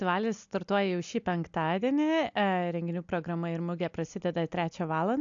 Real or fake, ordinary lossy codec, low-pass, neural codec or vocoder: real; AAC, 64 kbps; 7.2 kHz; none